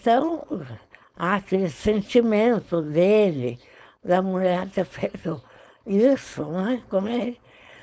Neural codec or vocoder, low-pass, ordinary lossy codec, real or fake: codec, 16 kHz, 4.8 kbps, FACodec; none; none; fake